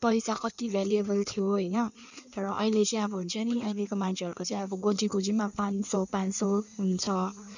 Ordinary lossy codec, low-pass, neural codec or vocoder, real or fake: none; 7.2 kHz; codec, 16 kHz in and 24 kHz out, 1.1 kbps, FireRedTTS-2 codec; fake